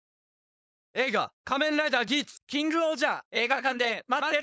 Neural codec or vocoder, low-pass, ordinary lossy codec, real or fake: codec, 16 kHz, 4.8 kbps, FACodec; none; none; fake